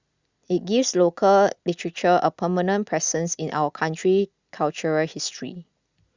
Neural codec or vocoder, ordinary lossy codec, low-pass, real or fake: none; Opus, 64 kbps; 7.2 kHz; real